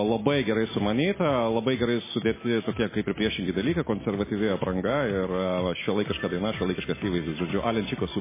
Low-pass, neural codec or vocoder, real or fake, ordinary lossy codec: 3.6 kHz; none; real; MP3, 16 kbps